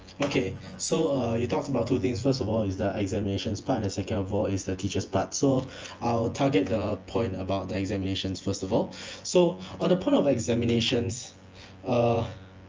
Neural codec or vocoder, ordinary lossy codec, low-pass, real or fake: vocoder, 24 kHz, 100 mel bands, Vocos; Opus, 24 kbps; 7.2 kHz; fake